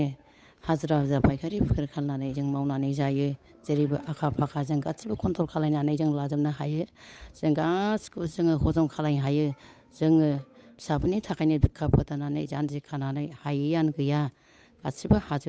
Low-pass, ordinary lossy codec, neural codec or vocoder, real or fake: none; none; codec, 16 kHz, 8 kbps, FunCodec, trained on Chinese and English, 25 frames a second; fake